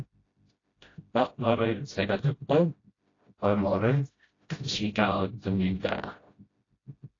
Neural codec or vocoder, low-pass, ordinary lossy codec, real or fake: codec, 16 kHz, 0.5 kbps, FreqCodec, smaller model; 7.2 kHz; AAC, 32 kbps; fake